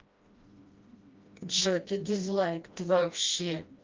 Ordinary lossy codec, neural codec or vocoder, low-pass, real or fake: Opus, 24 kbps; codec, 16 kHz, 1 kbps, FreqCodec, smaller model; 7.2 kHz; fake